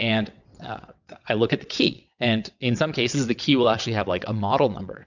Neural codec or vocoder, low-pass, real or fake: vocoder, 22.05 kHz, 80 mel bands, WaveNeXt; 7.2 kHz; fake